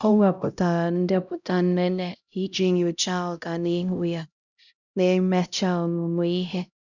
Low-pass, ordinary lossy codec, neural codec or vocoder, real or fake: 7.2 kHz; none; codec, 16 kHz, 0.5 kbps, X-Codec, HuBERT features, trained on LibriSpeech; fake